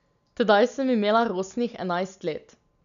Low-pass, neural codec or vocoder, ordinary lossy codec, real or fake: 7.2 kHz; none; none; real